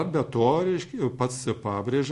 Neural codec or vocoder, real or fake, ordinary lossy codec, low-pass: none; real; MP3, 48 kbps; 14.4 kHz